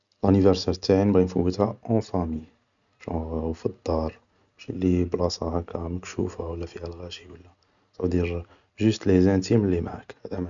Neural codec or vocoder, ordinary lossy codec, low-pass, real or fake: none; none; 7.2 kHz; real